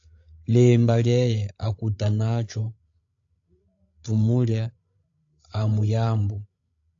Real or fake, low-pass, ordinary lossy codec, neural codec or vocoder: fake; 7.2 kHz; AAC, 48 kbps; codec, 16 kHz, 16 kbps, FreqCodec, larger model